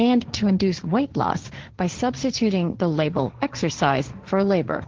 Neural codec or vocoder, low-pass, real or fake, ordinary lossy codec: codec, 16 kHz, 1.1 kbps, Voila-Tokenizer; 7.2 kHz; fake; Opus, 16 kbps